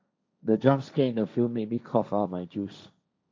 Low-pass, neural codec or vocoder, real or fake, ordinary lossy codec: none; codec, 16 kHz, 1.1 kbps, Voila-Tokenizer; fake; none